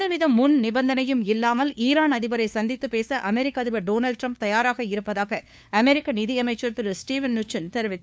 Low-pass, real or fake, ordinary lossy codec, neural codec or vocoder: none; fake; none; codec, 16 kHz, 2 kbps, FunCodec, trained on LibriTTS, 25 frames a second